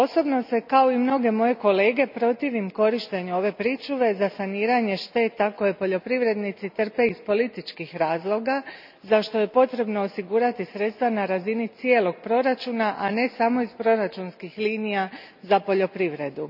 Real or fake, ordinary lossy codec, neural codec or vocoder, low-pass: real; none; none; 5.4 kHz